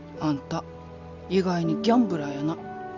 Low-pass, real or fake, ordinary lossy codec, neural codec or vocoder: 7.2 kHz; real; none; none